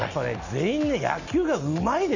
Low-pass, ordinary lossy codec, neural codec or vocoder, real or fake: 7.2 kHz; none; none; real